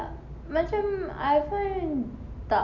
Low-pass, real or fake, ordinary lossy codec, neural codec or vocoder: 7.2 kHz; real; none; none